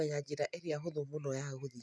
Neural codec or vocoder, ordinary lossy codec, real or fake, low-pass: none; none; real; none